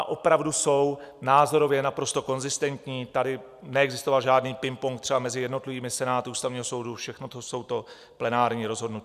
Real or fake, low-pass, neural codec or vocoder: real; 14.4 kHz; none